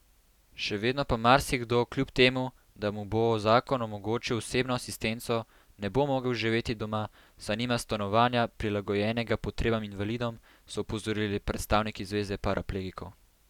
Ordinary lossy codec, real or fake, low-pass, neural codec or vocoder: none; real; 19.8 kHz; none